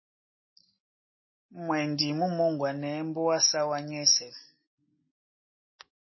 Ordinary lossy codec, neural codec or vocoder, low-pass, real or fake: MP3, 24 kbps; none; 7.2 kHz; real